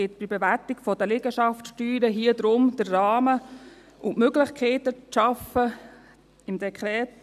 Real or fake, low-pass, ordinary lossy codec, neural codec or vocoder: real; 14.4 kHz; none; none